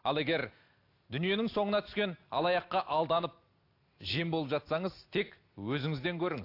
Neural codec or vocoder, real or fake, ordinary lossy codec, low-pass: none; real; AAC, 32 kbps; 5.4 kHz